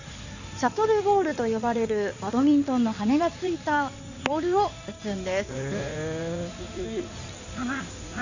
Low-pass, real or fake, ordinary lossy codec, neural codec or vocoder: 7.2 kHz; fake; none; codec, 16 kHz in and 24 kHz out, 2.2 kbps, FireRedTTS-2 codec